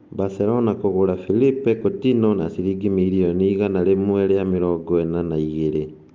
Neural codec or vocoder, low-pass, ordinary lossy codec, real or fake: none; 7.2 kHz; Opus, 24 kbps; real